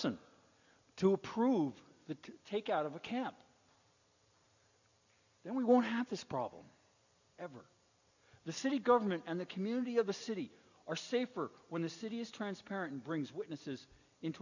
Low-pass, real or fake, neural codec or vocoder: 7.2 kHz; real; none